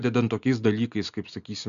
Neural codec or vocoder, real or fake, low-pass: none; real; 7.2 kHz